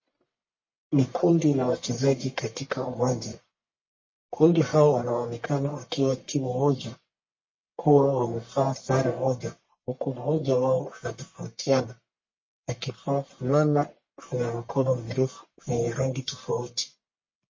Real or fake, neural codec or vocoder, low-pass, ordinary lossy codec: fake; codec, 44.1 kHz, 1.7 kbps, Pupu-Codec; 7.2 kHz; MP3, 32 kbps